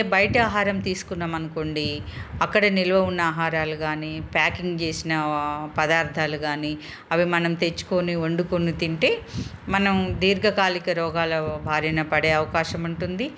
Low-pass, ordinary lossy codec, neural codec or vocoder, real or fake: none; none; none; real